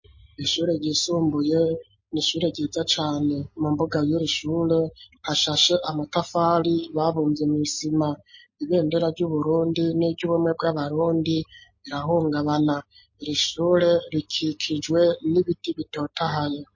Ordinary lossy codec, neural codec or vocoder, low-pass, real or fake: MP3, 32 kbps; none; 7.2 kHz; real